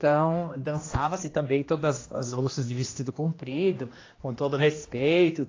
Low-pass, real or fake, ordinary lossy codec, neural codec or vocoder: 7.2 kHz; fake; AAC, 32 kbps; codec, 16 kHz, 1 kbps, X-Codec, HuBERT features, trained on general audio